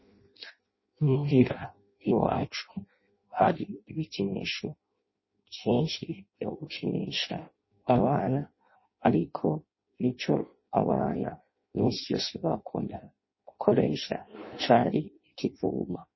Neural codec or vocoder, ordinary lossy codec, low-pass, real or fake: codec, 16 kHz in and 24 kHz out, 0.6 kbps, FireRedTTS-2 codec; MP3, 24 kbps; 7.2 kHz; fake